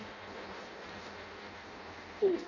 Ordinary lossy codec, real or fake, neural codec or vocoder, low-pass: none; fake; codec, 16 kHz in and 24 kHz out, 0.6 kbps, FireRedTTS-2 codec; 7.2 kHz